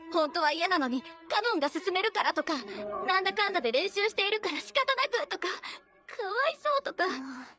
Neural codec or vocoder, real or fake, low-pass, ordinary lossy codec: codec, 16 kHz, 4 kbps, FreqCodec, larger model; fake; none; none